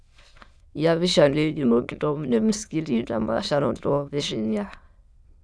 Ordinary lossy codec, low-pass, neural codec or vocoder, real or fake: none; none; autoencoder, 22.05 kHz, a latent of 192 numbers a frame, VITS, trained on many speakers; fake